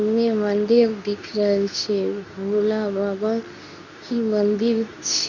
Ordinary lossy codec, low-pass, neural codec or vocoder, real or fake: none; 7.2 kHz; codec, 24 kHz, 0.9 kbps, WavTokenizer, medium speech release version 2; fake